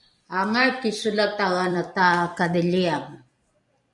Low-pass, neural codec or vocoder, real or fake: 10.8 kHz; vocoder, 44.1 kHz, 128 mel bands every 256 samples, BigVGAN v2; fake